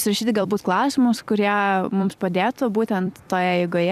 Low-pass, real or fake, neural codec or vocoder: 14.4 kHz; fake; vocoder, 44.1 kHz, 128 mel bands every 256 samples, BigVGAN v2